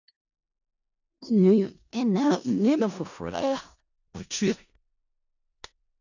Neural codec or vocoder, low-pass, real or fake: codec, 16 kHz in and 24 kHz out, 0.4 kbps, LongCat-Audio-Codec, four codebook decoder; 7.2 kHz; fake